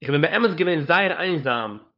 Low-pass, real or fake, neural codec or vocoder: 5.4 kHz; fake; codec, 16 kHz, 4 kbps, FunCodec, trained on LibriTTS, 50 frames a second